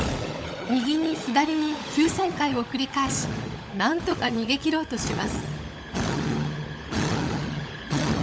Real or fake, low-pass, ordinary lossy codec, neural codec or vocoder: fake; none; none; codec, 16 kHz, 16 kbps, FunCodec, trained on LibriTTS, 50 frames a second